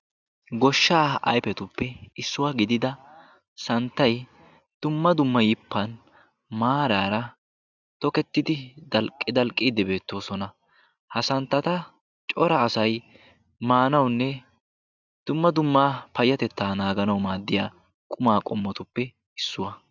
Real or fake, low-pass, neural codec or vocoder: real; 7.2 kHz; none